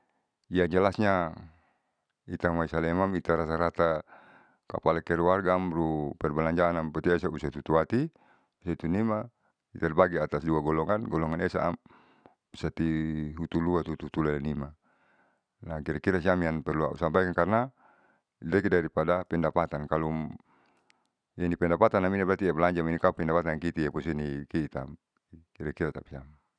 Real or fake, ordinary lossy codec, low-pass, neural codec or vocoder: real; none; 9.9 kHz; none